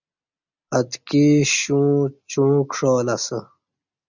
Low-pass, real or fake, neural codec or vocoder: 7.2 kHz; real; none